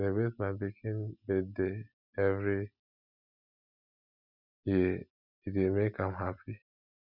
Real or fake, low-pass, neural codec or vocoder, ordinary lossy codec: real; 5.4 kHz; none; none